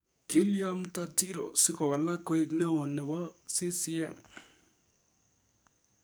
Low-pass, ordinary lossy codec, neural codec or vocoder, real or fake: none; none; codec, 44.1 kHz, 2.6 kbps, SNAC; fake